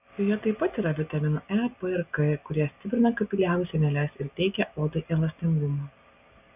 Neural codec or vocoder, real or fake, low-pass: none; real; 3.6 kHz